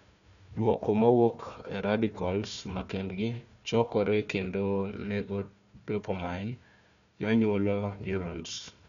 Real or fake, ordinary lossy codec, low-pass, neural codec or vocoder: fake; none; 7.2 kHz; codec, 16 kHz, 1 kbps, FunCodec, trained on Chinese and English, 50 frames a second